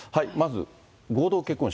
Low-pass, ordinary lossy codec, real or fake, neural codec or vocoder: none; none; real; none